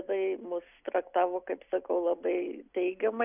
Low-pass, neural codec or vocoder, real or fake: 3.6 kHz; none; real